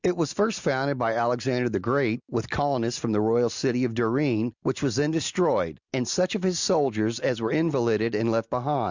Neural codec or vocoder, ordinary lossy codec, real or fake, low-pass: none; Opus, 64 kbps; real; 7.2 kHz